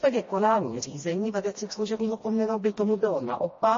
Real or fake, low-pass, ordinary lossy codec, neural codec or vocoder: fake; 7.2 kHz; MP3, 32 kbps; codec, 16 kHz, 1 kbps, FreqCodec, smaller model